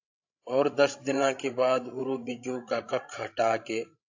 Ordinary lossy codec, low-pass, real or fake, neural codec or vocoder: AAC, 48 kbps; 7.2 kHz; fake; codec, 16 kHz, 16 kbps, FreqCodec, larger model